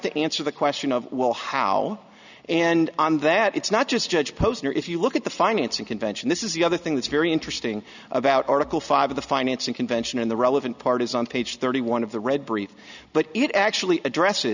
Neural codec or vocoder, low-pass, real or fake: none; 7.2 kHz; real